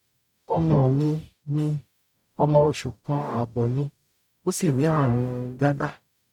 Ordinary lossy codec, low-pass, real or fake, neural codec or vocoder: none; 19.8 kHz; fake; codec, 44.1 kHz, 0.9 kbps, DAC